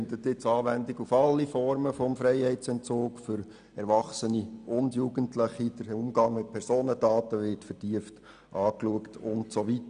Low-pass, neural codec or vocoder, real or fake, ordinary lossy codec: 9.9 kHz; none; real; none